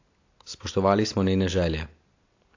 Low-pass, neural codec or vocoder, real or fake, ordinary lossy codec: 7.2 kHz; none; real; AAC, 48 kbps